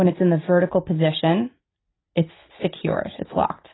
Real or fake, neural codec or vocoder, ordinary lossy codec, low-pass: real; none; AAC, 16 kbps; 7.2 kHz